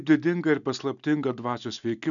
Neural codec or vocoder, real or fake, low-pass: none; real; 7.2 kHz